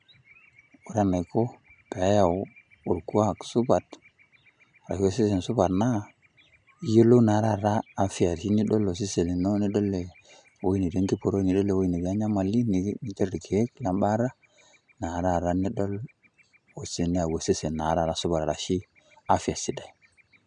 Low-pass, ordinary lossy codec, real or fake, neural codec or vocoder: 9.9 kHz; none; real; none